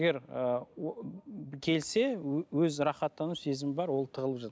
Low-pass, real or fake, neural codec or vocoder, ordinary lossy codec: none; real; none; none